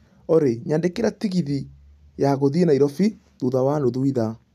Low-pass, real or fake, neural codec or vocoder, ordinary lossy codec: 14.4 kHz; real; none; none